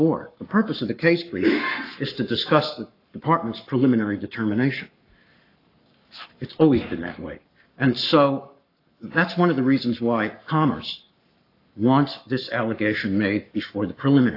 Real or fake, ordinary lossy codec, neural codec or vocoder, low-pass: fake; AAC, 32 kbps; codec, 44.1 kHz, 7.8 kbps, Pupu-Codec; 5.4 kHz